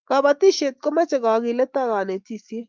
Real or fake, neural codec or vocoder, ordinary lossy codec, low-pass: fake; autoencoder, 48 kHz, 128 numbers a frame, DAC-VAE, trained on Japanese speech; Opus, 24 kbps; 7.2 kHz